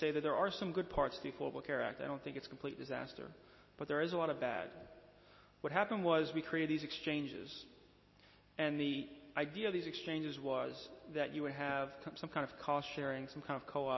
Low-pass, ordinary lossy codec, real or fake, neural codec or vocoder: 7.2 kHz; MP3, 24 kbps; real; none